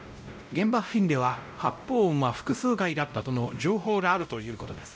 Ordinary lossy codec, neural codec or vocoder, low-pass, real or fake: none; codec, 16 kHz, 0.5 kbps, X-Codec, WavLM features, trained on Multilingual LibriSpeech; none; fake